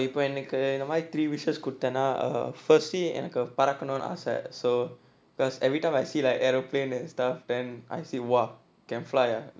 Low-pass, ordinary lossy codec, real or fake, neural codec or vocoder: none; none; real; none